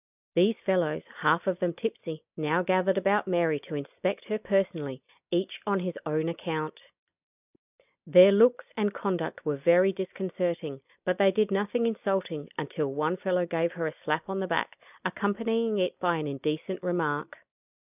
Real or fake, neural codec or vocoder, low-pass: real; none; 3.6 kHz